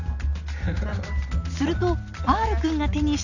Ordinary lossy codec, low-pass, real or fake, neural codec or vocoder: none; 7.2 kHz; real; none